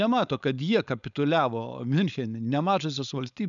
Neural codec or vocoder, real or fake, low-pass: codec, 16 kHz, 4.8 kbps, FACodec; fake; 7.2 kHz